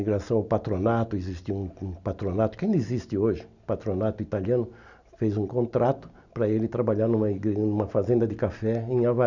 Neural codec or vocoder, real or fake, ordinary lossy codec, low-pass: none; real; none; 7.2 kHz